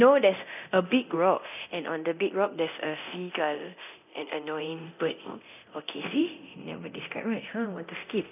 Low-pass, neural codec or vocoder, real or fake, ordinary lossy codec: 3.6 kHz; codec, 24 kHz, 0.9 kbps, DualCodec; fake; none